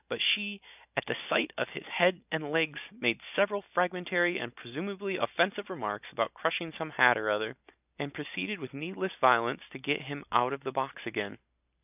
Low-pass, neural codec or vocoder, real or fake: 3.6 kHz; none; real